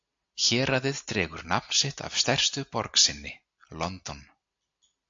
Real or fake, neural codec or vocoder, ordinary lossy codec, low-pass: real; none; AAC, 64 kbps; 7.2 kHz